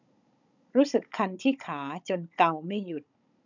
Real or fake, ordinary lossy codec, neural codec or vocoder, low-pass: fake; none; codec, 16 kHz, 16 kbps, FunCodec, trained on Chinese and English, 50 frames a second; 7.2 kHz